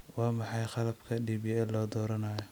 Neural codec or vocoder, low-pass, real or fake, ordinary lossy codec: none; none; real; none